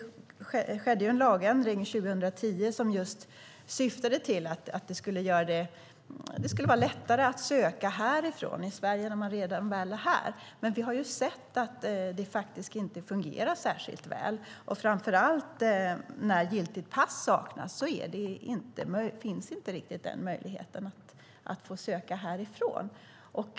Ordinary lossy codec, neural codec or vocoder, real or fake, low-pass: none; none; real; none